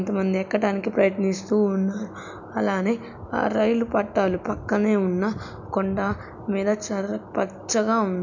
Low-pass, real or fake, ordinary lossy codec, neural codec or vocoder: 7.2 kHz; real; none; none